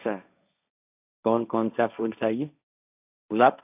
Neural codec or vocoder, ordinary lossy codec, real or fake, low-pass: codec, 16 kHz, 1.1 kbps, Voila-Tokenizer; none; fake; 3.6 kHz